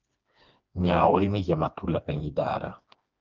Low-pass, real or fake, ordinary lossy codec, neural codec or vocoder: 7.2 kHz; fake; Opus, 24 kbps; codec, 16 kHz, 2 kbps, FreqCodec, smaller model